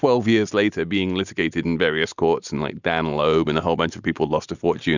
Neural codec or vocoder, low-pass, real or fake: none; 7.2 kHz; real